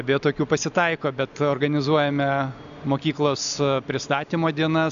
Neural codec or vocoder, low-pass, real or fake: none; 7.2 kHz; real